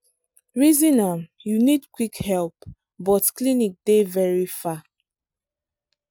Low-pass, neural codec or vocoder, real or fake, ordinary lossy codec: none; none; real; none